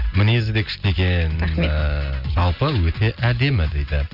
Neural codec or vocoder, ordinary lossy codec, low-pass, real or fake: none; none; 5.4 kHz; real